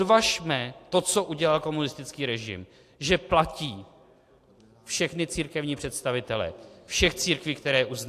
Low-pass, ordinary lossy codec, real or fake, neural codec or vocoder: 14.4 kHz; AAC, 64 kbps; real; none